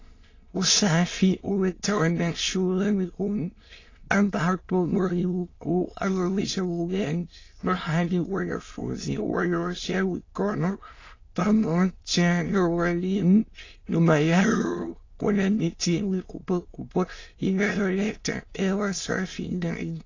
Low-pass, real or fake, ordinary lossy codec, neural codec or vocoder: 7.2 kHz; fake; AAC, 32 kbps; autoencoder, 22.05 kHz, a latent of 192 numbers a frame, VITS, trained on many speakers